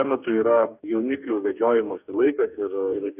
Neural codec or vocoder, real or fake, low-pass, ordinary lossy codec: codec, 44.1 kHz, 2.6 kbps, DAC; fake; 3.6 kHz; AAC, 32 kbps